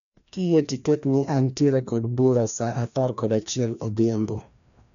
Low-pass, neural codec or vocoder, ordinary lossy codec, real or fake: 7.2 kHz; codec, 16 kHz, 1 kbps, FreqCodec, larger model; none; fake